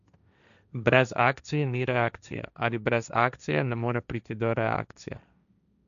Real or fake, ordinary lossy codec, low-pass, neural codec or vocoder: fake; none; 7.2 kHz; codec, 16 kHz, 1.1 kbps, Voila-Tokenizer